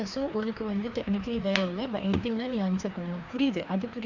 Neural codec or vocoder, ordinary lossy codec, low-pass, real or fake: codec, 16 kHz, 2 kbps, FreqCodec, larger model; none; 7.2 kHz; fake